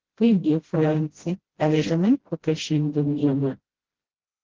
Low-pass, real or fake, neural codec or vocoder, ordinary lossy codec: 7.2 kHz; fake; codec, 16 kHz, 0.5 kbps, FreqCodec, smaller model; Opus, 16 kbps